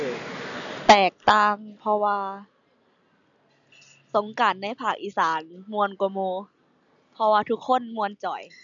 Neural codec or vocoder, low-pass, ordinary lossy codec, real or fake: none; 7.2 kHz; none; real